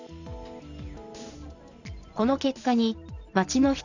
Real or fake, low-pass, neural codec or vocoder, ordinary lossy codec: real; 7.2 kHz; none; none